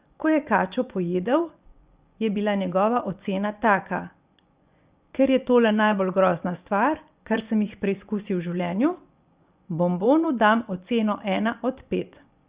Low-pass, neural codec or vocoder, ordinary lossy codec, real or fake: 3.6 kHz; vocoder, 24 kHz, 100 mel bands, Vocos; Opus, 64 kbps; fake